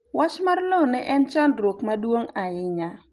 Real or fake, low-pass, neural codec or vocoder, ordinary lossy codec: real; 10.8 kHz; none; Opus, 24 kbps